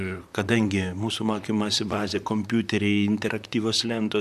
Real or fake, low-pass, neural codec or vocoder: fake; 14.4 kHz; vocoder, 44.1 kHz, 128 mel bands, Pupu-Vocoder